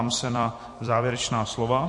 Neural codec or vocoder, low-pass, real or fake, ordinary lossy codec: vocoder, 44.1 kHz, 128 mel bands every 256 samples, BigVGAN v2; 10.8 kHz; fake; MP3, 48 kbps